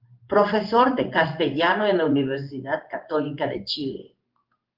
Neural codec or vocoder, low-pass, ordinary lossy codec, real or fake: codec, 16 kHz in and 24 kHz out, 1 kbps, XY-Tokenizer; 5.4 kHz; Opus, 32 kbps; fake